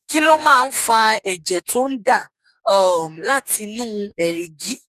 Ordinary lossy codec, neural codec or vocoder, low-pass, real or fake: none; codec, 44.1 kHz, 2.6 kbps, DAC; 14.4 kHz; fake